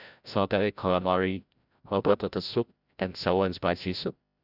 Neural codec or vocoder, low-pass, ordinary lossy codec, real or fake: codec, 16 kHz, 0.5 kbps, FreqCodec, larger model; 5.4 kHz; none; fake